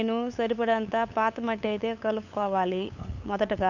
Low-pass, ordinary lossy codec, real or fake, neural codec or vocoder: 7.2 kHz; none; fake; codec, 16 kHz, 8 kbps, FunCodec, trained on LibriTTS, 25 frames a second